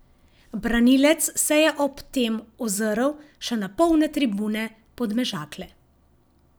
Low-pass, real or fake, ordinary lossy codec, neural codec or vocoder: none; real; none; none